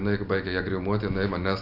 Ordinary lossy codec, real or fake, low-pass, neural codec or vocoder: AAC, 48 kbps; real; 5.4 kHz; none